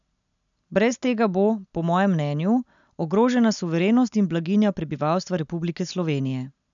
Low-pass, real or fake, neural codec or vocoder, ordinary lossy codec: 7.2 kHz; real; none; none